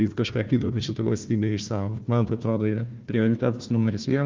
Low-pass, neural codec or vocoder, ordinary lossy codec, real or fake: 7.2 kHz; codec, 16 kHz, 1 kbps, FunCodec, trained on Chinese and English, 50 frames a second; Opus, 24 kbps; fake